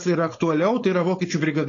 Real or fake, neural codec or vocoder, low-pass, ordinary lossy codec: fake; codec, 16 kHz, 4.8 kbps, FACodec; 7.2 kHz; AAC, 32 kbps